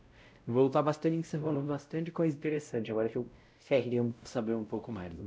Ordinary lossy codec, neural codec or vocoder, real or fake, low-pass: none; codec, 16 kHz, 0.5 kbps, X-Codec, WavLM features, trained on Multilingual LibriSpeech; fake; none